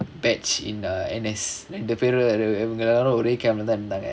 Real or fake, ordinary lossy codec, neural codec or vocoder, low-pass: real; none; none; none